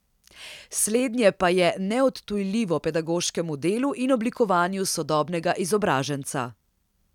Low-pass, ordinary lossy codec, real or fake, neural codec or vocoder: 19.8 kHz; none; real; none